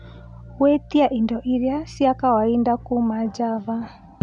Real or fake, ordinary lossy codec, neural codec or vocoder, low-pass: real; none; none; 10.8 kHz